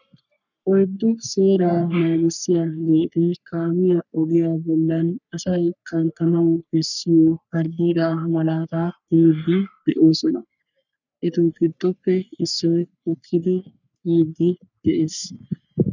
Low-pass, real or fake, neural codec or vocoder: 7.2 kHz; fake; codec, 44.1 kHz, 3.4 kbps, Pupu-Codec